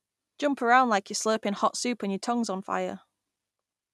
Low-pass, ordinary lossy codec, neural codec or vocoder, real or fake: none; none; none; real